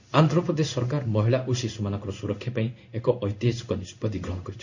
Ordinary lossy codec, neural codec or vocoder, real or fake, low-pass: none; codec, 16 kHz in and 24 kHz out, 1 kbps, XY-Tokenizer; fake; 7.2 kHz